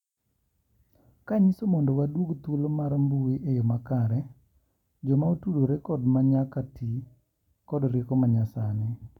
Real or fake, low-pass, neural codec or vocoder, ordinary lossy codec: real; 19.8 kHz; none; none